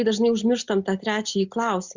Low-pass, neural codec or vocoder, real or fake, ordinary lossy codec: 7.2 kHz; none; real; Opus, 64 kbps